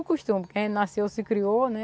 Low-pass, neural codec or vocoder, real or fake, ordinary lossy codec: none; none; real; none